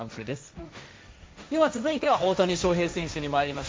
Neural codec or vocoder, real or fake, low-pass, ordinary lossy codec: codec, 16 kHz, 1.1 kbps, Voila-Tokenizer; fake; none; none